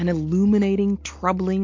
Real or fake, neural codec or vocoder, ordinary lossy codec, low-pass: real; none; MP3, 48 kbps; 7.2 kHz